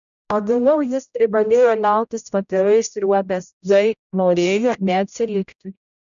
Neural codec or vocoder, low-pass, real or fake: codec, 16 kHz, 0.5 kbps, X-Codec, HuBERT features, trained on general audio; 7.2 kHz; fake